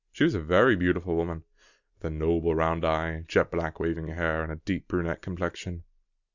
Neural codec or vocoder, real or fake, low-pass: none; real; 7.2 kHz